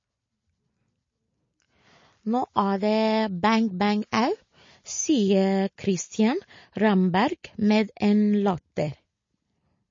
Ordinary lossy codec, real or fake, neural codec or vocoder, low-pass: MP3, 32 kbps; real; none; 7.2 kHz